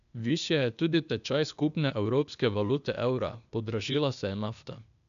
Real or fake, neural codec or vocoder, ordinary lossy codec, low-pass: fake; codec, 16 kHz, 0.8 kbps, ZipCodec; none; 7.2 kHz